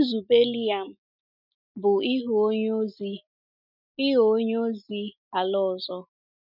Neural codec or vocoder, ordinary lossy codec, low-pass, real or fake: none; none; 5.4 kHz; real